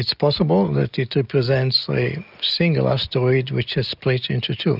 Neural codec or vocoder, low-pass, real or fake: none; 5.4 kHz; real